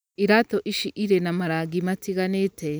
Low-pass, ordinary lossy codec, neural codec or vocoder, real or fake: none; none; none; real